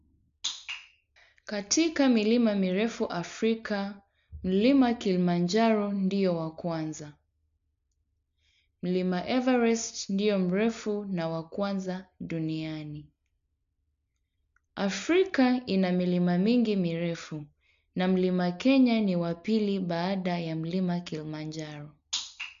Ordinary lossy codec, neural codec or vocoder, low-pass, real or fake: MP3, 64 kbps; none; 7.2 kHz; real